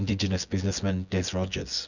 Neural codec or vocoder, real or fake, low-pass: vocoder, 24 kHz, 100 mel bands, Vocos; fake; 7.2 kHz